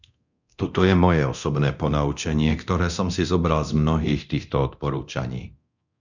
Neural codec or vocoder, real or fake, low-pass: codec, 24 kHz, 0.9 kbps, DualCodec; fake; 7.2 kHz